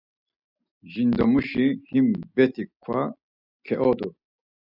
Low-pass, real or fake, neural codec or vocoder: 5.4 kHz; real; none